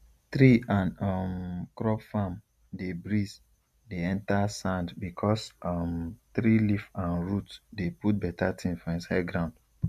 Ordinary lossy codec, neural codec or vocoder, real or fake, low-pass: MP3, 96 kbps; none; real; 14.4 kHz